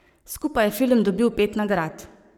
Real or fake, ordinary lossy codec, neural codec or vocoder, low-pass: fake; none; codec, 44.1 kHz, 7.8 kbps, Pupu-Codec; 19.8 kHz